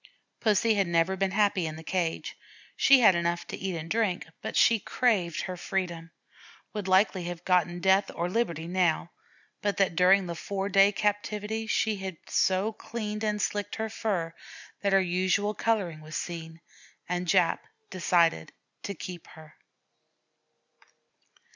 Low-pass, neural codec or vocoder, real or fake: 7.2 kHz; none; real